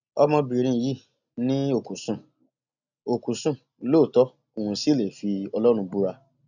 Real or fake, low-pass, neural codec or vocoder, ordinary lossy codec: real; 7.2 kHz; none; none